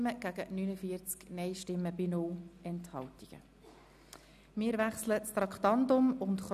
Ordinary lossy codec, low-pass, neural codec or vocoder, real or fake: none; 14.4 kHz; none; real